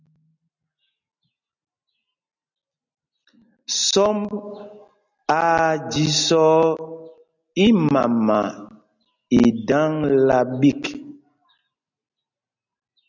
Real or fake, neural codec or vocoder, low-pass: real; none; 7.2 kHz